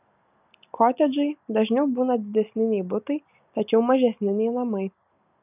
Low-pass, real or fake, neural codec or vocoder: 3.6 kHz; real; none